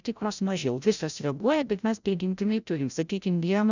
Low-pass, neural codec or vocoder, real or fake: 7.2 kHz; codec, 16 kHz, 0.5 kbps, FreqCodec, larger model; fake